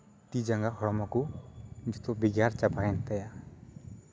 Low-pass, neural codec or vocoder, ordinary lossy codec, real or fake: none; none; none; real